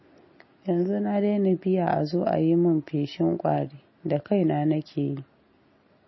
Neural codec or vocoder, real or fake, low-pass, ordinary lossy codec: none; real; 7.2 kHz; MP3, 24 kbps